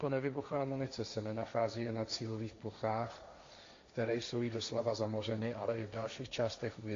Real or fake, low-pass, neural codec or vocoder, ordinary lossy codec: fake; 7.2 kHz; codec, 16 kHz, 1.1 kbps, Voila-Tokenizer; MP3, 48 kbps